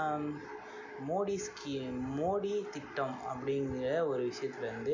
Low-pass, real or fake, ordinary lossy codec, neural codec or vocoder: 7.2 kHz; real; MP3, 48 kbps; none